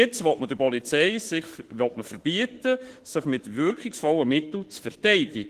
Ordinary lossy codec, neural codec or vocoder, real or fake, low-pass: Opus, 16 kbps; autoencoder, 48 kHz, 32 numbers a frame, DAC-VAE, trained on Japanese speech; fake; 14.4 kHz